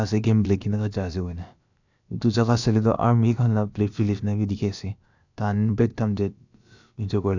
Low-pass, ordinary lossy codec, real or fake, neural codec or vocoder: 7.2 kHz; none; fake; codec, 16 kHz, about 1 kbps, DyCAST, with the encoder's durations